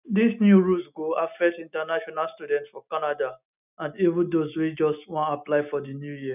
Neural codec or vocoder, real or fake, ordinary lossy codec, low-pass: none; real; none; 3.6 kHz